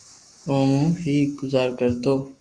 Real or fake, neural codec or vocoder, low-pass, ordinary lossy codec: fake; codec, 44.1 kHz, 7.8 kbps, Pupu-Codec; 9.9 kHz; Opus, 64 kbps